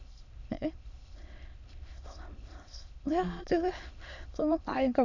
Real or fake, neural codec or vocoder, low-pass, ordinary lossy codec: fake; autoencoder, 22.05 kHz, a latent of 192 numbers a frame, VITS, trained on many speakers; 7.2 kHz; none